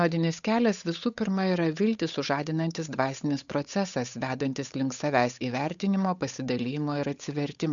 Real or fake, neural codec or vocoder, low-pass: fake; codec, 16 kHz, 8 kbps, FunCodec, trained on Chinese and English, 25 frames a second; 7.2 kHz